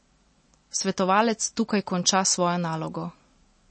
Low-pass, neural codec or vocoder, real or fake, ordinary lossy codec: 9.9 kHz; none; real; MP3, 32 kbps